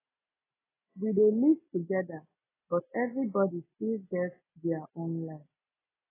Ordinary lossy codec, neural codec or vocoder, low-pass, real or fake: AAC, 16 kbps; none; 3.6 kHz; real